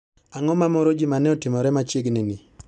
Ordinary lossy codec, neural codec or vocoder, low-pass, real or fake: none; none; 9.9 kHz; real